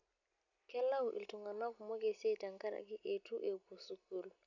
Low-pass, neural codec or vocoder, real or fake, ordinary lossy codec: 7.2 kHz; none; real; none